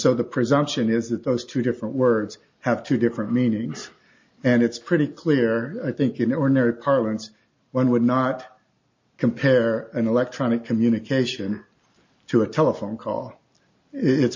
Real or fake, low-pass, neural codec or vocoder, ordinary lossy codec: real; 7.2 kHz; none; MP3, 48 kbps